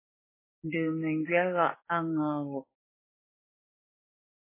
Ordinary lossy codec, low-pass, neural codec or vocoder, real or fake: MP3, 16 kbps; 3.6 kHz; codec, 16 kHz, 6 kbps, DAC; fake